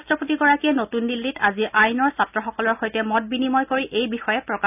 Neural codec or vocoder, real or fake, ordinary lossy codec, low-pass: none; real; none; 3.6 kHz